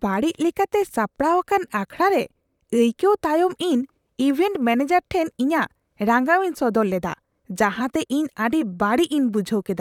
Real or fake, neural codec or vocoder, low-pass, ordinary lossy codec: fake; vocoder, 44.1 kHz, 128 mel bands, Pupu-Vocoder; 19.8 kHz; none